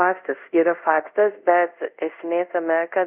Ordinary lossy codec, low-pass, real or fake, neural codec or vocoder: Opus, 64 kbps; 3.6 kHz; fake; codec, 24 kHz, 0.5 kbps, DualCodec